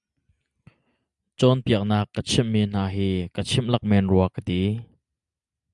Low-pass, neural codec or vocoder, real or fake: 10.8 kHz; none; real